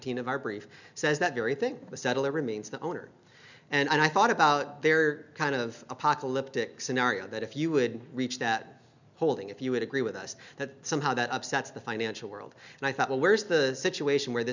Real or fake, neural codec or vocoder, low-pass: real; none; 7.2 kHz